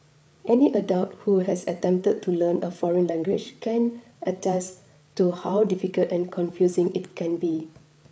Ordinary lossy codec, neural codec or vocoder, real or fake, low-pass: none; codec, 16 kHz, 8 kbps, FreqCodec, larger model; fake; none